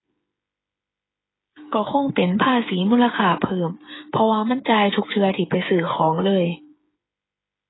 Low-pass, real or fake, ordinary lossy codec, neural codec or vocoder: 7.2 kHz; fake; AAC, 16 kbps; codec, 16 kHz, 8 kbps, FreqCodec, smaller model